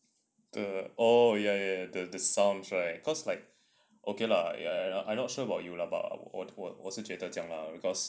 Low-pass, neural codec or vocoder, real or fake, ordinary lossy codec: none; none; real; none